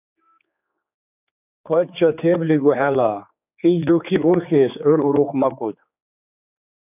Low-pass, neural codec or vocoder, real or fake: 3.6 kHz; codec, 16 kHz, 4 kbps, X-Codec, HuBERT features, trained on general audio; fake